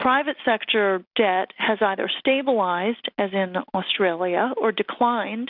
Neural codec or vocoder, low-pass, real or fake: none; 5.4 kHz; real